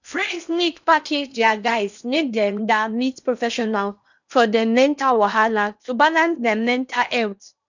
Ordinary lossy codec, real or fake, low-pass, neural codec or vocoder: none; fake; 7.2 kHz; codec, 16 kHz in and 24 kHz out, 0.8 kbps, FocalCodec, streaming, 65536 codes